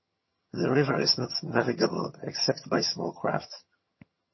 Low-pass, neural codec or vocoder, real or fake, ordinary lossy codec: 7.2 kHz; vocoder, 22.05 kHz, 80 mel bands, HiFi-GAN; fake; MP3, 24 kbps